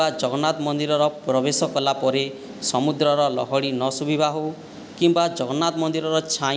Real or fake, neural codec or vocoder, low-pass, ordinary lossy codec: real; none; none; none